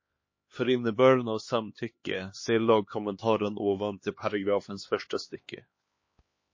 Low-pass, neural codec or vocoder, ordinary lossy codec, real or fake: 7.2 kHz; codec, 16 kHz, 2 kbps, X-Codec, HuBERT features, trained on LibriSpeech; MP3, 32 kbps; fake